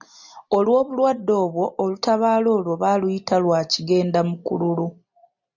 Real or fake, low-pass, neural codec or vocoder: real; 7.2 kHz; none